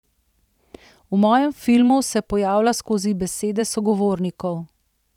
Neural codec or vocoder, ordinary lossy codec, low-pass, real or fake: vocoder, 44.1 kHz, 128 mel bands every 512 samples, BigVGAN v2; none; 19.8 kHz; fake